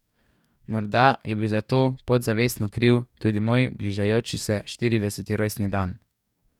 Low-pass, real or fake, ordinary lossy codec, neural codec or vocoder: 19.8 kHz; fake; none; codec, 44.1 kHz, 2.6 kbps, DAC